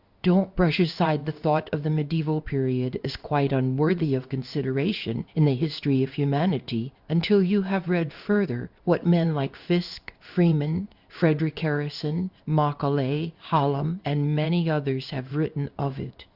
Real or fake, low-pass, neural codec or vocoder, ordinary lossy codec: fake; 5.4 kHz; codec, 16 kHz in and 24 kHz out, 1 kbps, XY-Tokenizer; Opus, 64 kbps